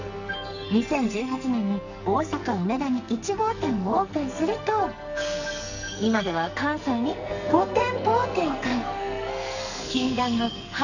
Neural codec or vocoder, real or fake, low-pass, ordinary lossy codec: codec, 44.1 kHz, 2.6 kbps, SNAC; fake; 7.2 kHz; none